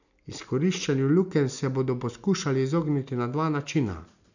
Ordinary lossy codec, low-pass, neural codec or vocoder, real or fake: none; 7.2 kHz; none; real